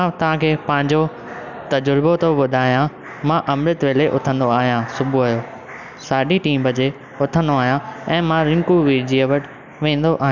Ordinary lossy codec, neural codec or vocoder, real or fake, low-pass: none; none; real; 7.2 kHz